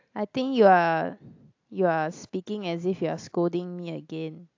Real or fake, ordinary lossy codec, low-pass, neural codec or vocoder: real; AAC, 48 kbps; 7.2 kHz; none